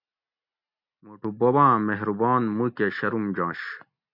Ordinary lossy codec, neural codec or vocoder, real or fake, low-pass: MP3, 48 kbps; none; real; 5.4 kHz